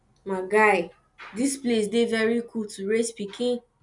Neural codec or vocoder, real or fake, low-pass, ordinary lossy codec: none; real; 10.8 kHz; none